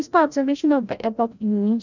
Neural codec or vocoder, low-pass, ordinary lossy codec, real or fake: codec, 16 kHz, 0.5 kbps, FreqCodec, larger model; 7.2 kHz; none; fake